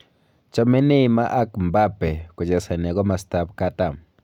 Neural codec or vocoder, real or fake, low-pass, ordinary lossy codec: none; real; 19.8 kHz; none